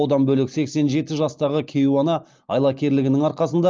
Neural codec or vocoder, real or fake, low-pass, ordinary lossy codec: none; real; 7.2 kHz; Opus, 24 kbps